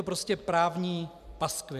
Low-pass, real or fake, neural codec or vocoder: 14.4 kHz; real; none